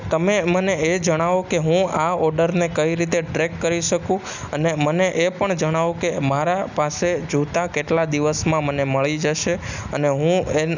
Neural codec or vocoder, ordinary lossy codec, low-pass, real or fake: none; none; 7.2 kHz; real